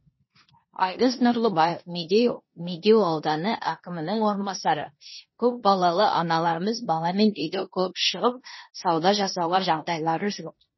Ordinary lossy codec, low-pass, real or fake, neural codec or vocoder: MP3, 24 kbps; 7.2 kHz; fake; codec, 16 kHz in and 24 kHz out, 0.9 kbps, LongCat-Audio-Codec, four codebook decoder